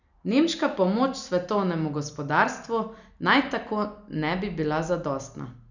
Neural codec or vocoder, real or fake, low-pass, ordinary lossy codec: none; real; 7.2 kHz; none